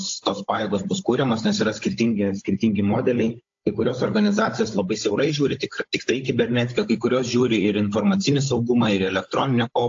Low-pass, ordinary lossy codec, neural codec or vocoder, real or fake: 7.2 kHz; AAC, 48 kbps; codec, 16 kHz, 16 kbps, FunCodec, trained on Chinese and English, 50 frames a second; fake